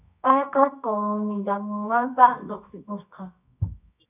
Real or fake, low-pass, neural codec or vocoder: fake; 3.6 kHz; codec, 24 kHz, 0.9 kbps, WavTokenizer, medium music audio release